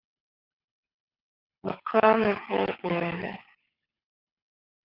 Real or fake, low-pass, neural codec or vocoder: fake; 5.4 kHz; codec, 24 kHz, 6 kbps, HILCodec